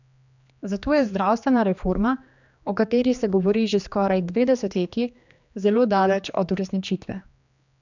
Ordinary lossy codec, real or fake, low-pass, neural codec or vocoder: none; fake; 7.2 kHz; codec, 16 kHz, 2 kbps, X-Codec, HuBERT features, trained on general audio